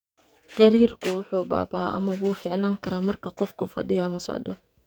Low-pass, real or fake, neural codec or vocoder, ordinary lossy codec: none; fake; codec, 44.1 kHz, 2.6 kbps, SNAC; none